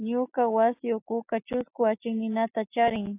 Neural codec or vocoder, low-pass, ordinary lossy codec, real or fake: none; 3.6 kHz; AAC, 24 kbps; real